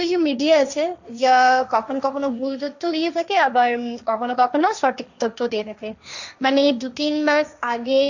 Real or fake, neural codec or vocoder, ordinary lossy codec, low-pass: fake; codec, 16 kHz, 1.1 kbps, Voila-Tokenizer; none; 7.2 kHz